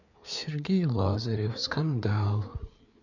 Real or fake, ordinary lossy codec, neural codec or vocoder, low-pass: fake; none; codec, 16 kHz, 4 kbps, FreqCodec, larger model; 7.2 kHz